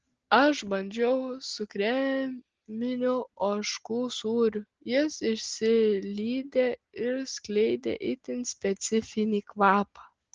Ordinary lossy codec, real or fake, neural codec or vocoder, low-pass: Opus, 16 kbps; real; none; 7.2 kHz